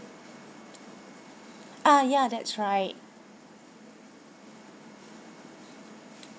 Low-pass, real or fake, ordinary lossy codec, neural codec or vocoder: none; real; none; none